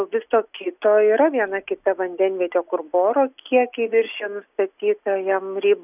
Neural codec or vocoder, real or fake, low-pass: none; real; 3.6 kHz